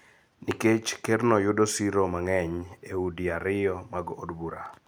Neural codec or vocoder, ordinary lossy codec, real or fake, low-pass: none; none; real; none